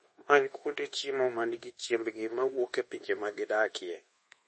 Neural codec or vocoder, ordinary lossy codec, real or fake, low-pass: codec, 24 kHz, 1.2 kbps, DualCodec; MP3, 32 kbps; fake; 10.8 kHz